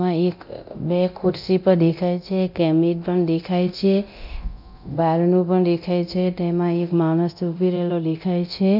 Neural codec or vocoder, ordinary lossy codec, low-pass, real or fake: codec, 24 kHz, 0.9 kbps, DualCodec; none; 5.4 kHz; fake